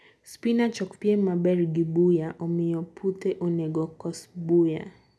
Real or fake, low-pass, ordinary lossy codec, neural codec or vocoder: real; none; none; none